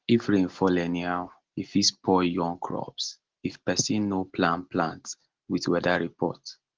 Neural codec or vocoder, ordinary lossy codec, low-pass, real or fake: none; Opus, 16 kbps; 7.2 kHz; real